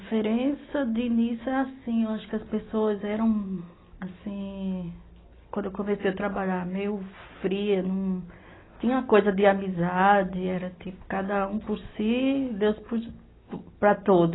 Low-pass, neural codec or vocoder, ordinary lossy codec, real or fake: 7.2 kHz; none; AAC, 16 kbps; real